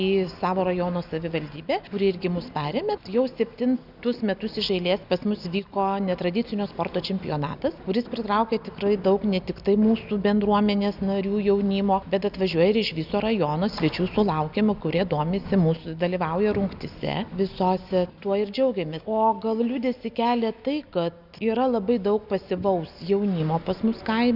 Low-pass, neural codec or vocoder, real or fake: 5.4 kHz; none; real